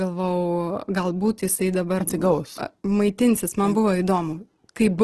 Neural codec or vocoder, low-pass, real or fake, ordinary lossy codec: none; 10.8 kHz; real; Opus, 16 kbps